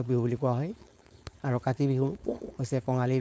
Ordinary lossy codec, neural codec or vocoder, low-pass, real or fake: none; codec, 16 kHz, 4.8 kbps, FACodec; none; fake